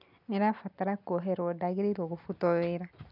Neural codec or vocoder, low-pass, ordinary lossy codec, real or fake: none; 5.4 kHz; none; real